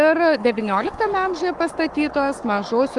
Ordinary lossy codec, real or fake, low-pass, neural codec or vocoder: Opus, 32 kbps; fake; 10.8 kHz; codec, 44.1 kHz, 7.8 kbps, Pupu-Codec